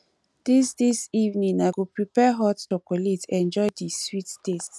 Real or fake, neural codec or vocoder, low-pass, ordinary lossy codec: real; none; none; none